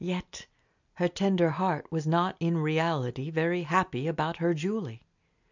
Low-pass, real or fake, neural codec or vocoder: 7.2 kHz; real; none